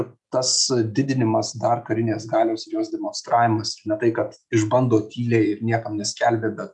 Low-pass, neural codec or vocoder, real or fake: 10.8 kHz; none; real